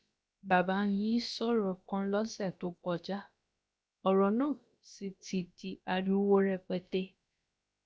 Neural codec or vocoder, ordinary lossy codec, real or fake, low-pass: codec, 16 kHz, about 1 kbps, DyCAST, with the encoder's durations; none; fake; none